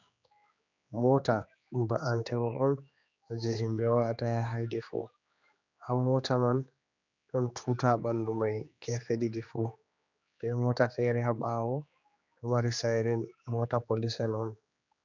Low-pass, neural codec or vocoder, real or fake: 7.2 kHz; codec, 16 kHz, 2 kbps, X-Codec, HuBERT features, trained on general audio; fake